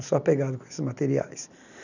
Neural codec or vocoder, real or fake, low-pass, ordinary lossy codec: none; real; 7.2 kHz; none